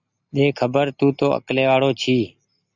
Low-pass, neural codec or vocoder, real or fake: 7.2 kHz; none; real